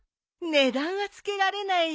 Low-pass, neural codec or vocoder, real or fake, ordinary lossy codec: none; none; real; none